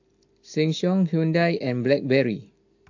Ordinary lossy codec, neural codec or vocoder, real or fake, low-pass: AAC, 48 kbps; none; real; 7.2 kHz